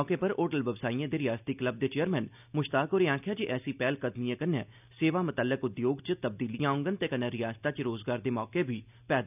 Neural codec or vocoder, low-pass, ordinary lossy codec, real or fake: none; 3.6 kHz; none; real